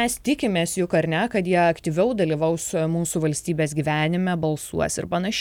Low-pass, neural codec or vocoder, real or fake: 19.8 kHz; none; real